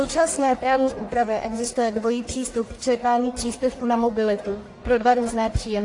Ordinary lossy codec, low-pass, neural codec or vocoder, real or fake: AAC, 48 kbps; 10.8 kHz; codec, 44.1 kHz, 1.7 kbps, Pupu-Codec; fake